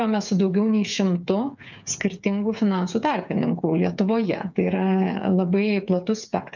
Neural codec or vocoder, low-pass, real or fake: codec, 16 kHz, 8 kbps, FreqCodec, smaller model; 7.2 kHz; fake